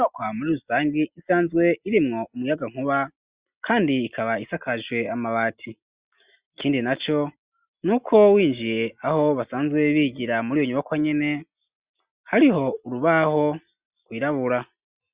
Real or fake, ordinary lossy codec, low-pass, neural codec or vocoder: real; Opus, 64 kbps; 3.6 kHz; none